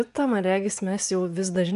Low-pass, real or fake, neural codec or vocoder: 10.8 kHz; fake; vocoder, 24 kHz, 100 mel bands, Vocos